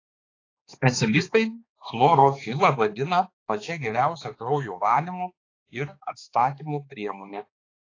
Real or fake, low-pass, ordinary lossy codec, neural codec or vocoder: fake; 7.2 kHz; AAC, 32 kbps; codec, 16 kHz, 2 kbps, X-Codec, HuBERT features, trained on balanced general audio